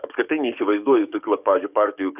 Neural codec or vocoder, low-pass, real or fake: codec, 44.1 kHz, 7.8 kbps, DAC; 3.6 kHz; fake